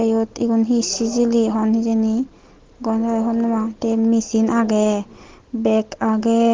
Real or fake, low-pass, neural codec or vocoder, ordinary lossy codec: real; 7.2 kHz; none; Opus, 16 kbps